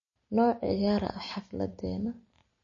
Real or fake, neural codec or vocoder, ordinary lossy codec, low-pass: real; none; MP3, 32 kbps; 7.2 kHz